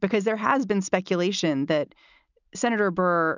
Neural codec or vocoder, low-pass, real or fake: none; 7.2 kHz; real